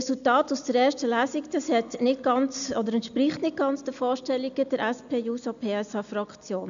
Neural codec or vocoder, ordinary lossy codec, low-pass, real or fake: none; none; 7.2 kHz; real